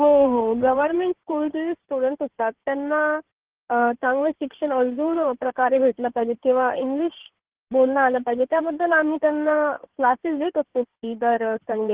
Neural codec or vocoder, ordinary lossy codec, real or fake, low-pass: codec, 16 kHz in and 24 kHz out, 2.2 kbps, FireRedTTS-2 codec; Opus, 16 kbps; fake; 3.6 kHz